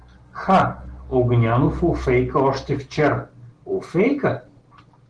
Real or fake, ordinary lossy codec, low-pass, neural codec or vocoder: real; Opus, 16 kbps; 9.9 kHz; none